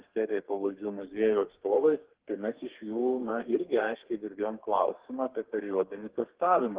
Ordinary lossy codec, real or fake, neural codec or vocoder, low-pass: Opus, 16 kbps; fake; codec, 32 kHz, 1.9 kbps, SNAC; 3.6 kHz